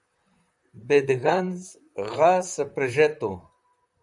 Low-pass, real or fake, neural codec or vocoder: 10.8 kHz; fake; vocoder, 44.1 kHz, 128 mel bands, Pupu-Vocoder